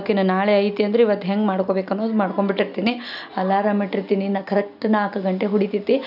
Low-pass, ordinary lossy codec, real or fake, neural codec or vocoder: 5.4 kHz; none; real; none